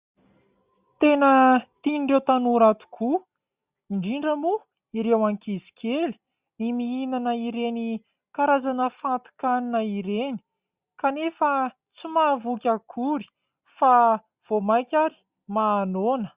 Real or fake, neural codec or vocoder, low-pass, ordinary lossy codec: real; none; 3.6 kHz; Opus, 64 kbps